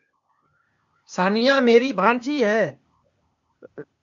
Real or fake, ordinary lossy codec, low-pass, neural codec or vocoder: fake; MP3, 48 kbps; 7.2 kHz; codec, 16 kHz, 0.8 kbps, ZipCodec